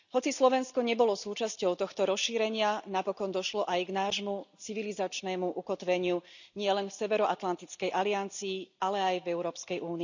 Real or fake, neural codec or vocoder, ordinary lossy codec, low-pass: real; none; none; 7.2 kHz